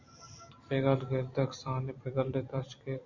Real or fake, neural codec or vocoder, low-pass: fake; vocoder, 44.1 kHz, 128 mel bands every 256 samples, BigVGAN v2; 7.2 kHz